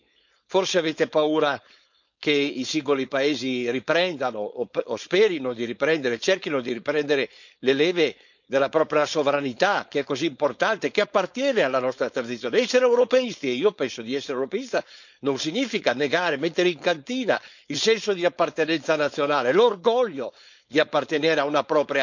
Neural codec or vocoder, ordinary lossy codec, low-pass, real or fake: codec, 16 kHz, 4.8 kbps, FACodec; none; 7.2 kHz; fake